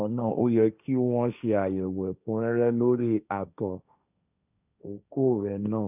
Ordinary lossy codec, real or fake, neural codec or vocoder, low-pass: none; fake; codec, 16 kHz, 1.1 kbps, Voila-Tokenizer; 3.6 kHz